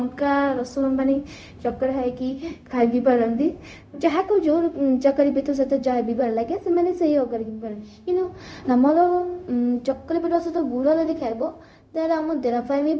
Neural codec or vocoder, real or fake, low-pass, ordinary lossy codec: codec, 16 kHz, 0.4 kbps, LongCat-Audio-Codec; fake; none; none